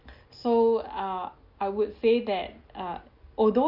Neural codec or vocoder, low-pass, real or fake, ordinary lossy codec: none; 5.4 kHz; real; Opus, 24 kbps